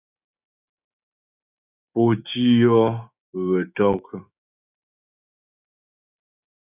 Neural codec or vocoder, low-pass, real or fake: codec, 16 kHz, 6 kbps, DAC; 3.6 kHz; fake